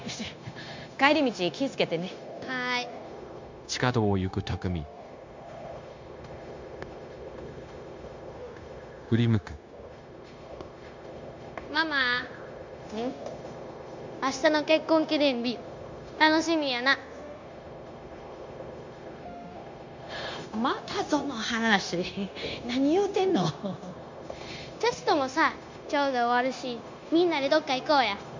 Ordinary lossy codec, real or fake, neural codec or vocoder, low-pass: MP3, 64 kbps; fake; codec, 16 kHz, 0.9 kbps, LongCat-Audio-Codec; 7.2 kHz